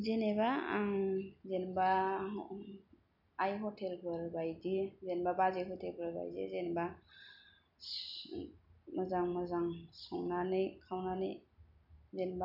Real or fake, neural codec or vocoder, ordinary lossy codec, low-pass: real; none; none; 5.4 kHz